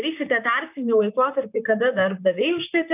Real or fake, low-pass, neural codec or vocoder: fake; 3.6 kHz; vocoder, 44.1 kHz, 128 mel bands, Pupu-Vocoder